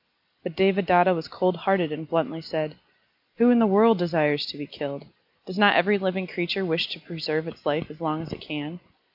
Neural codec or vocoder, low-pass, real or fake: none; 5.4 kHz; real